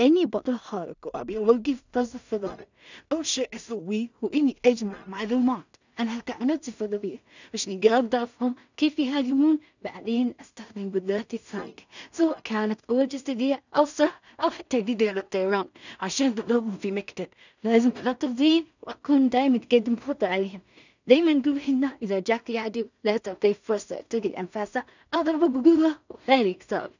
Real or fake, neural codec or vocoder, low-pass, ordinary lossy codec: fake; codec, 16 kHz in and 24 kHz out, 0.4 kbps, LongCat-Audio-Codec, two codebook decoder; 7.2 kHz; none